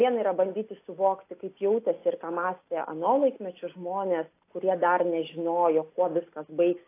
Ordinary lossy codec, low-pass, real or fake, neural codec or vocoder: AAC, 24 kbps; 3.6 kHz; real; none